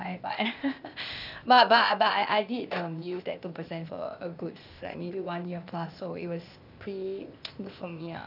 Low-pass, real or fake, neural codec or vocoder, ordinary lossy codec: 5.4 kHz; fake; codec, 16 kHz, 0.8 kbps, ZipCodec; none